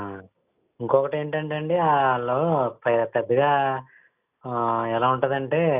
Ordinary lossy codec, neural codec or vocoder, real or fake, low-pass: none; none; real; 3.6 kHz